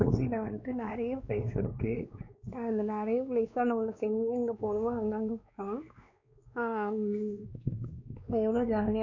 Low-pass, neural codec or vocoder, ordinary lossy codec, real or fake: 7.2 kHz; codec, 16 kHz, 2 kbps, X-Codec, WavLM features, trained on Multilingual LibriSpeech; none; fake